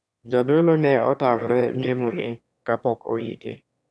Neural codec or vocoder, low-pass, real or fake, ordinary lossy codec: autoencoder, 22.05 kHz, a latent of 192 numbers a frame, VITS, trained on one speaker; none; fake; none